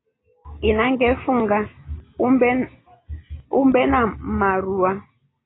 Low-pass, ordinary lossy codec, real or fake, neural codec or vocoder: 7.2 kHz; AAC, 16 kbps; real; none